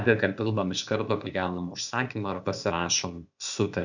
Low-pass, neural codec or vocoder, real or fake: 7.2 kHz; codec, 16 kHz, 0.8 kbps, ZipCodec; fake